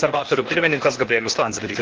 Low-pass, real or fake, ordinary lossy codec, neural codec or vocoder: 7.2 kHz; fake; Opus, 16 kbps; codec, 16 kHz, 0.8 kbps, ZipCodec